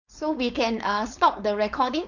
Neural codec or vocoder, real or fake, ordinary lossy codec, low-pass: codec, 16 kHz, 4.8 kbps, FACodec; fake; none; 7.2 kHz